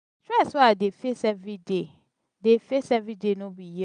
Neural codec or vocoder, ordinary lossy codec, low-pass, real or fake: none; none; 9.9 kHz; real